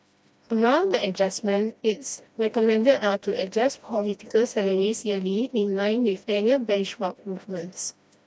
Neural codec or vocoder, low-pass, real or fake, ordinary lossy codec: codec, 16 kHz, 1 kbps, FreqCodec, smaller model; none; fake; none